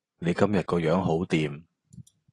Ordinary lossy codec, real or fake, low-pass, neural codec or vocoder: AAC, 32 kbps; real; 10.8 kHz; none